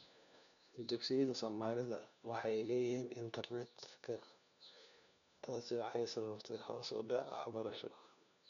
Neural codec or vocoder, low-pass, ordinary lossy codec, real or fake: codec, 16 kHz, 1 kbps, FunCodec, trained on LibriTTS, 50 frames a second; 7.2 kHz; none; fake